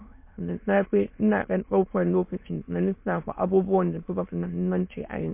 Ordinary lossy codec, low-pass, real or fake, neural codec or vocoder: MP3, 24 kbps; 3.6 kHz; fake; autoencoder, 22.05 kHz, a latent of 192 numbers a frame, VITS, trained on many speakers